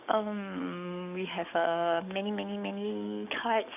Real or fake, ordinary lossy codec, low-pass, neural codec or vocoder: fake; none; 3.6 kHz; codec, 44.1 kHz, 7.8 kbps, DAC